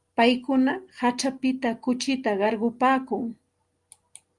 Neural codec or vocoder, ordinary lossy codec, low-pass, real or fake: none; Opus, 32 kbps; 10.8 kHz; real